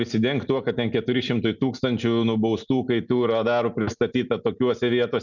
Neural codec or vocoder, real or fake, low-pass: none; real; 7.2 kHz